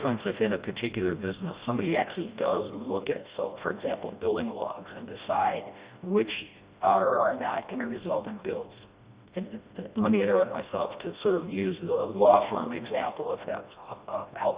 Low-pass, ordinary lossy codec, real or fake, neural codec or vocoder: 3.6 kHz; Opus, 64 kbps; fake; codec, 16 kHz, 1 kbps, FreqCodec, smaller model